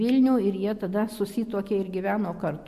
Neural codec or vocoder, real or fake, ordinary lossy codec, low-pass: none; real; MP3, 64 kbps; 14.4 kHz